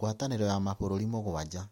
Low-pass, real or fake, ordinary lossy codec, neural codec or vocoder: 19.8 kHz; real; MP3, 64 kbps; none